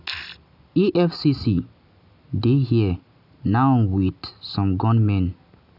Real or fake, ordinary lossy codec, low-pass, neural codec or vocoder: real; none; 5.4 kHz; none